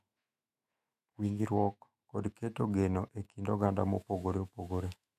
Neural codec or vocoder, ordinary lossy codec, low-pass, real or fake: autoencoder, 48 kHz, 128 numbers a frame, DAC-VAE, trained on Japanese speech; MP3, 64 kbps; 14.4 kHz; fake